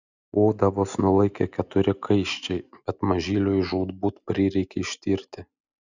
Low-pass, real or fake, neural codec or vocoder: 7.2 kHz; real; none